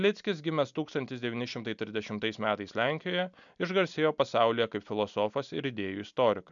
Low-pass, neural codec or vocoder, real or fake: 7.2 kHz; none; real